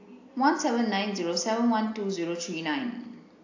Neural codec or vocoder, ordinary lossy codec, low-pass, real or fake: none; AAC, 48 kbps; 7.2 kHz; real